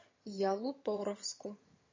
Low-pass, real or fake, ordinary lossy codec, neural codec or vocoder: 7.2 kHz; fake; MP3, 32 kbps; vocoder, 22.05 kHz, 80 mel bands, HiFi-GAN